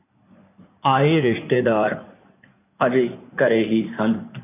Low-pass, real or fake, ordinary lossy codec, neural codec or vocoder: 3.6 kHz; fake; AAC, 24 kbps; codec, 16 kHz in and 24 kHz out, 2.2 kbps, FireRedTTS-2 codec